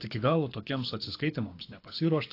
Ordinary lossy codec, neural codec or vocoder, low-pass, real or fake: AAC, 32 kbps; codec, 24 kHz, 6 kbps, HILCodec; 5.4 kHz; fake